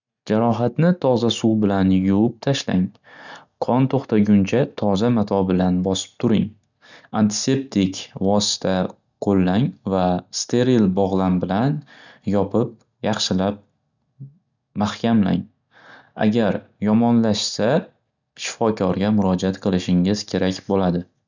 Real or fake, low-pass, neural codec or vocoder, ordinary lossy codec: real; 7.2 kHz; none; none